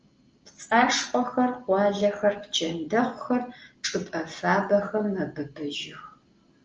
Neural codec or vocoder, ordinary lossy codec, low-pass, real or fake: none; Opus, 24 kbps; 7.2 kHz; real